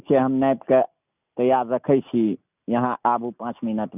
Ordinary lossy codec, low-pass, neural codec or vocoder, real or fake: none; 3.6 kHz; none; real